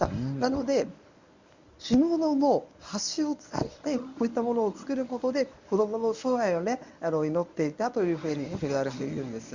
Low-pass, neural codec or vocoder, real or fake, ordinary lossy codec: 7.2 kHz; codec, 24 kHz, 0.9 kbps, WavTokenizer, medium speech release version 1; fake; Opus, 64 kbps